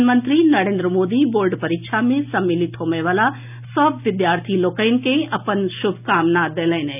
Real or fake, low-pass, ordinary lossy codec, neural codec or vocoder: real; 3.6 kHz; none; none